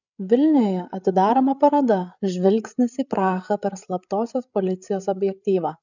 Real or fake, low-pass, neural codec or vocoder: fake; 7.2 kHz; codec, 16 kHz, 8 kbps, FreqCodec, larger model